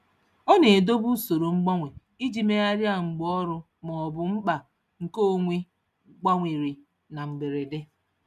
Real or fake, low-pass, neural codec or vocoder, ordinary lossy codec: real; 14.4 kHz; none; none